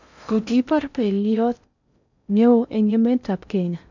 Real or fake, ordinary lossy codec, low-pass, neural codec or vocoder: fake; none; 7.2 kHz; codec, 16 kHz in and 24 kHz out, 0.6 kbps, FocalCodec, streaming, 4096 codes